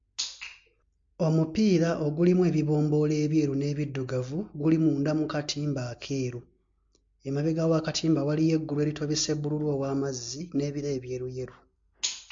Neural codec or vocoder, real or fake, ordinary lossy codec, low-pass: none; real; MP3, 48 kbps; 7.2 kHz